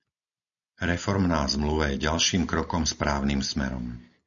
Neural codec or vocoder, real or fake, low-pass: none; real; 7.2 kHz